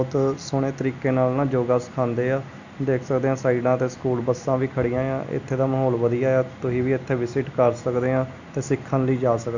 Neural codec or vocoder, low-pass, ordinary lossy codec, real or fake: none; 7.2 kHz; none; real